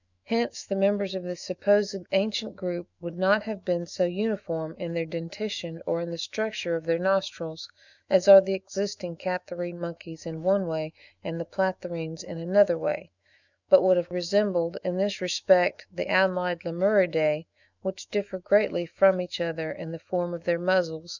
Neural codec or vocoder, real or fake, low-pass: autoencoder, 48 kHz, 128 numbers a frame, DAC-VAE, trained on Japanese speech; fake; 7.2 kHz